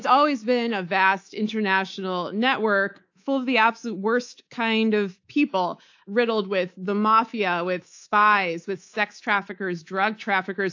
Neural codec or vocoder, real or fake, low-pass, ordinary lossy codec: codec, 24 kHz, 3.1 kbps, DualCodec; fake; 7.2 kHz; AAC, 48 kbps